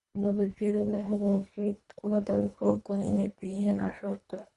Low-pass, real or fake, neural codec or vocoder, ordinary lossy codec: 10.8 kHz; fake; codec, 24 kHz, 1.5 kbps, HILCodec; Opus, 64 kbps